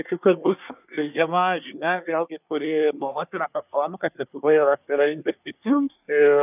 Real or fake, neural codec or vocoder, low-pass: fake; codec, 24 kHz, 1 kbps, SNAC; 3.6 kHz